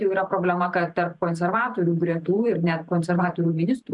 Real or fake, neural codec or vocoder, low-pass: fake; vocoder, 44.1 kHz, 128 mel bands every 512 samples, BigVGAN v2; 10.8 kHz